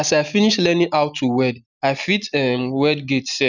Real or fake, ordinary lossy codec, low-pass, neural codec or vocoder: real; none; 7.2 kHz; none